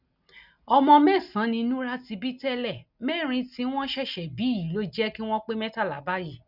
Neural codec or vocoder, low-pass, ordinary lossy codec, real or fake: none; 5.4 kHz; none; real